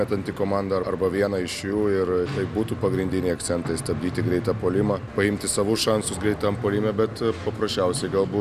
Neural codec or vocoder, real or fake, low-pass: none; real; 14.4 kHz